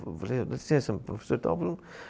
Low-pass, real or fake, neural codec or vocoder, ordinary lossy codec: none; real; none; none